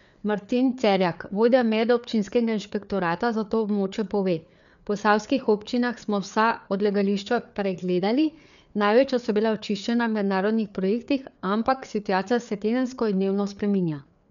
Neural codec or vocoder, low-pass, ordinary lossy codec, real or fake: codec, 16 kHz, 4 kbps, FreqCodec, larger model; 7.2 kHz; none; fake